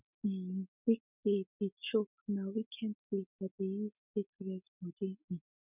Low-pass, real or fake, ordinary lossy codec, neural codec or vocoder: 3.6 kHz; real; none; none